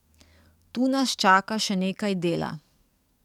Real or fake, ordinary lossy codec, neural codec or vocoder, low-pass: fake; none; codec, 44.1 kHz, 7.8 kbps, DAC; 19.8 kHz